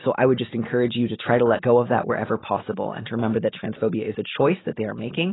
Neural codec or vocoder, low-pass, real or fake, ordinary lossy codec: none; 7.2 kHz; real; AAC, 16 kbps